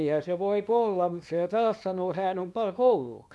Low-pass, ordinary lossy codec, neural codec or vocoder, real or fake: none; none; codec, 24 kHz, 0.9 kbps, WavTokenizer, small release; fake